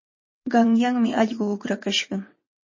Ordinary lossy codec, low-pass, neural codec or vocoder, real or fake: MP3, 32 kbps; 7.2 kHz; vocoder, 22.05 kHz, 80 mel bands, WaveNeXt; fake